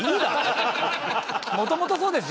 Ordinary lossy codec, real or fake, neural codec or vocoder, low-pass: none; real; none; none